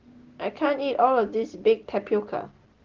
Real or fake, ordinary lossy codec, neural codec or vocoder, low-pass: real; Opus, 16 kbps; none; 7.2 kHz